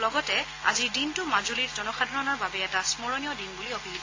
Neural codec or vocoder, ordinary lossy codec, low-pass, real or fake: none; AAC, 32 kbps; 7.2 kHz; real